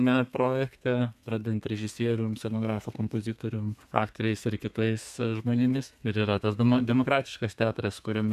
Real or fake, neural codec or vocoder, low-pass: fake; codec, 32 kHz, 1.9 kbps, SNAC; 14.4 kHz